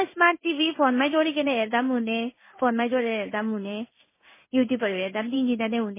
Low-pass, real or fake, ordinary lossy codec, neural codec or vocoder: 3.6 kHz; fake; MP3, 16 kbps; codec, 16 kHz in and 24 kHz out, 1 kbps, XY-Tokenizer